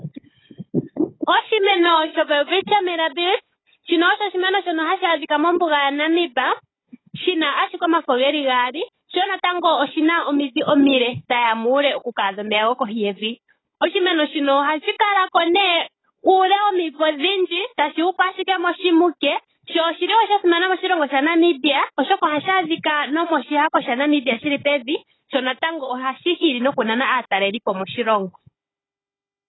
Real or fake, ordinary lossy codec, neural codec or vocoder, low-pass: fake; AAC, 16 kbps; codec, 16 kHz, 16 kbps, FunCodec, trained on Chinese and English, 50 frames a second; 7.2 kHz